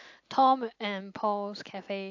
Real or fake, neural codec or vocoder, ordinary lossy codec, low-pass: real; none; AAC, 48 kbps; 7.2 kHz